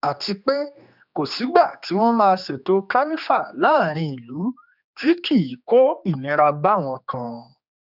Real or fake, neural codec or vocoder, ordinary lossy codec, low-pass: fake; codec, 16 kHz, 2 kbps, X-Codec, HuBERT features, trained on general audio; none; 5.4 kHz